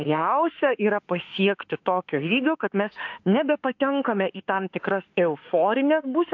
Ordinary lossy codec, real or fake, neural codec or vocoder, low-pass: AAC, 48 kbps; fake; autoencoder, 48 kHz, 32 numbers a frame, DAC-VAE, trained on Japanese speech; 7.2 kHz